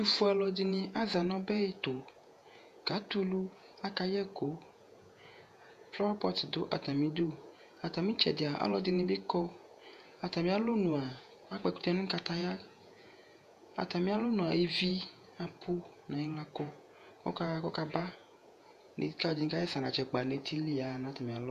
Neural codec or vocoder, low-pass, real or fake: vocoder, 48 kHz, 128 mel bands, Vocos; 14.4 kHz; fake